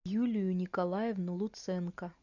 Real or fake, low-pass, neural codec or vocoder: real; 7.2 kHz; none